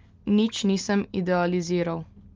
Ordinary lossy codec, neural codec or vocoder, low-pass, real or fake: Opus, 24 kbps; none; 7.2 kHz; real